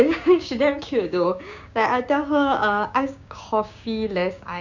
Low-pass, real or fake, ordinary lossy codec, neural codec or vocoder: 7.2 kHz; fake; none; codec, 16 kHz, 6 kbps, DAC